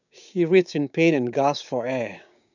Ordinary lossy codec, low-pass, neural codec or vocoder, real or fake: none; 7.2 kHz; codec, 16 kHz, 6 kbps, DAC; fake